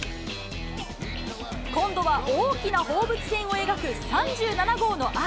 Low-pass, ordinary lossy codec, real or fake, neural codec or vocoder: none; none; real; none